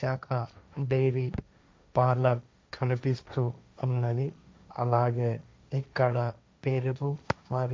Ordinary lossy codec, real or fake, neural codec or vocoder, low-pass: none; fake; codec, 16 kHz, 1.1 kbps, Voila-Tokenizer; none